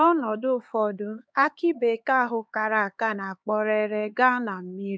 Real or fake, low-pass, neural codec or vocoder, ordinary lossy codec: fake; none; codec, 16 kHz, 4 kbps, X-Codec, HuBERT features, trained on LibriSpeech; none